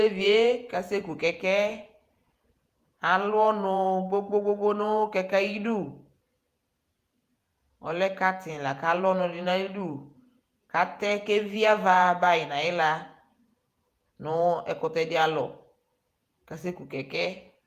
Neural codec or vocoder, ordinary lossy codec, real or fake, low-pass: vocoder, 48 kHz, 128 mel bands, Vocos; Opus, 32 kbps; fake; 14.4 kHz